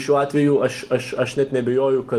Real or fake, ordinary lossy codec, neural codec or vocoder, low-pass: real; Opus, 24 kbps; none; 14.4 kHz